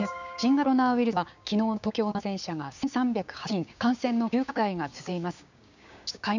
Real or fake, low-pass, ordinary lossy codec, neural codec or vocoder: fake; 7.2 kHz; none; codec, 16 kHz, 6 kbps, DAC